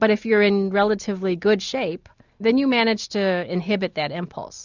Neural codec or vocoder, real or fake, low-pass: none; real; 7.2 kHz